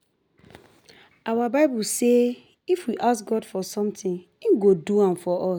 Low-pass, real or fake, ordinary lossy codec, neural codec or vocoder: none; real; none; none